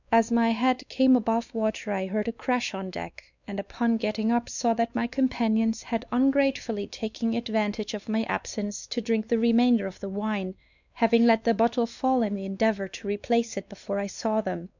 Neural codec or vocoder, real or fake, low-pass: codec, 16 kHz, 2 kbps, X-Codec, WavLM features, trained on Multilingual LibriSpeech; fake; 7.2 kHz